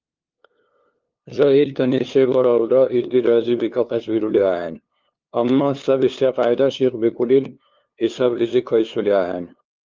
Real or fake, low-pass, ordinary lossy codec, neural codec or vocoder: fake; 7.2 kHz; Opus, 24 kbps; codec, 16 kHz, 2 kbps, FunCodec, trained on LibriTTS, 25 frames a second